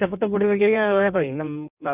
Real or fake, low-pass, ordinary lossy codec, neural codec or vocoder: fake; 3.6 kHz; none; codec, 16 kHz in and 24 kHz out, 1.1 kbps, FireRedTTS-2 codec